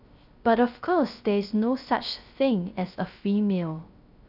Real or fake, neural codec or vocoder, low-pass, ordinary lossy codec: fake; codec, 16 kHz, 0.3 kbps, FocalCodec; 5.4 kHz; none